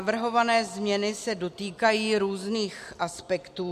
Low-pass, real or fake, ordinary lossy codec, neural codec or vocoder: 14.4 kHz; real; MP3, 64 kbps; none